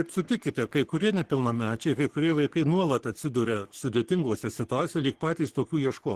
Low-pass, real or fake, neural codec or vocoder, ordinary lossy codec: 14.4 kHz; fake; codec, 44.1 kHz, 3.4 kbps, Pupu-Codec; Opus, 16 kbps